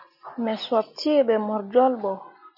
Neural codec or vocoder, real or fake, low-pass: none; real; 5.4 kHz